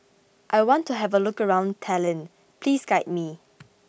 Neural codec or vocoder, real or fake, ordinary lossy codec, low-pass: none; real; none; none